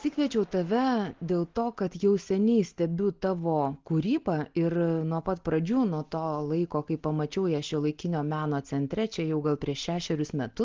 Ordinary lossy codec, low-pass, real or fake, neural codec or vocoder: Opus, 16 kbps; 7.2 kHz; real; none